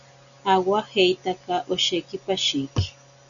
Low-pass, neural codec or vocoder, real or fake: 7.2 kHz; none; real